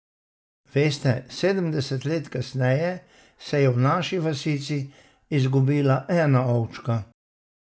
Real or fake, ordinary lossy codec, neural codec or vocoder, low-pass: real; none; none; none